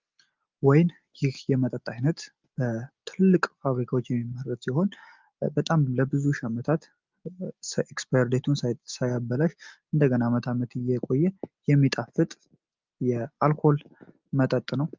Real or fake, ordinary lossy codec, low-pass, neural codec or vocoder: real; Opus, 24 kbps; 7.2 kHz; none